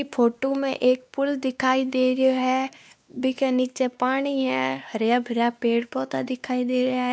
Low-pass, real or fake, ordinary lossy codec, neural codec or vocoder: none; fake; none; codec, 16 kHz, 2 kbps, X-Codec, WavLM features, trained on Multilingual LibriSpeech